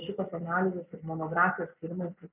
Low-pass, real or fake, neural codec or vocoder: 3.6 kHz; real; none